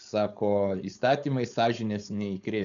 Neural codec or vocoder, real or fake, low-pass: codec, 16 kHz, 4.8 kbps, FACodec; fake; 7.2 kHz